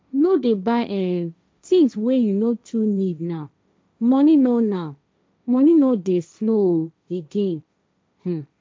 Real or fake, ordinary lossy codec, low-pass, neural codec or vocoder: fake; none; none; codec, 16 kHz, 1.1 kbps, Voila-Tokenizer